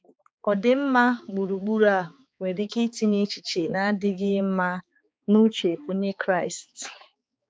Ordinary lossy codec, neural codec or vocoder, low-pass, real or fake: none; codec, 16 kHz, 4 kbps, X-Codec, HuBERT features, trained on balanced general audio; none; fake